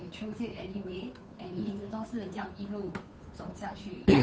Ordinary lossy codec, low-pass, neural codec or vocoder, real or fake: none; none; codec, 16 kHz, 2 kbps, FunCodec, trained on Chinese and English, 25 frames a second; fake